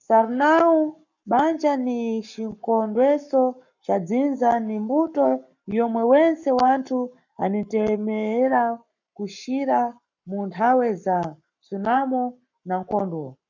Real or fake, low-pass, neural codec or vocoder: fake; 7.2 kHz; codec, 44.1 kHz, 7.8 kbps, Pupu-Codec